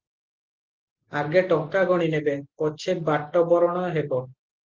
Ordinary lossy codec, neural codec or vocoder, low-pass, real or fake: Opus, 16 kbps; none; 7.2 kHz; real